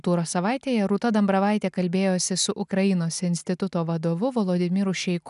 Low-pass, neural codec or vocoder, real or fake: 10.8 kHz; none; real